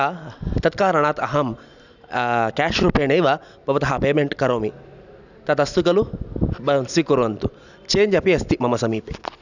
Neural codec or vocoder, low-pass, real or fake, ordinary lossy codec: none; 7.2 kHz; real; none